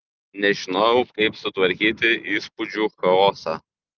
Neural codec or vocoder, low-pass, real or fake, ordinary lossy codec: none; 7.2 kHz; real; Opus, 32 kbps